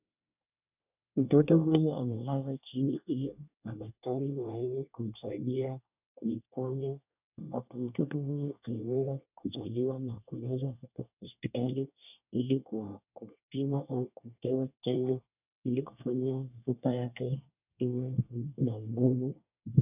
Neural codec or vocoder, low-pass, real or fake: codec, 24 kHz, 1 kbps, SNAC; 3.6 kHz; fake